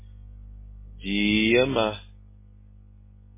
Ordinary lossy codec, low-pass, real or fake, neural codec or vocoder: MP3, 16 kbps; 3.6 kHz; real; none